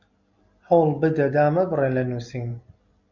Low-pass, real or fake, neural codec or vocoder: 7.2 kHz; real; none